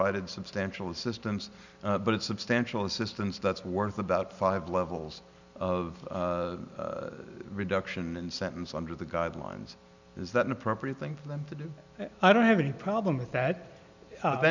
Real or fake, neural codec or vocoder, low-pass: real; none; 7.2 kHz